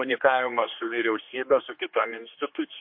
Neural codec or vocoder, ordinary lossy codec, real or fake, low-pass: codec, 16 kHz, 2 kbps, X-Codec, HuBERT features, trained on general audio; MP3, 32 kbps; fake; 5.4 kHz